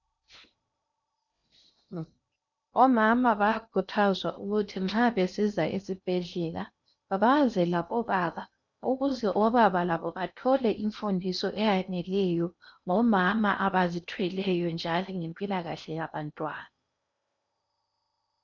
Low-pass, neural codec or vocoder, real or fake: 7.2 kHz; codec, 16 kHz in and 24 kHz out, 0.8 kbps, FocalCodec, streaming, 65536 codes; fake